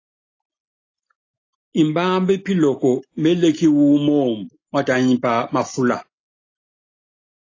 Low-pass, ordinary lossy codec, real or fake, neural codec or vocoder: 7.2 kHz; AAC, 32 kbps; real; none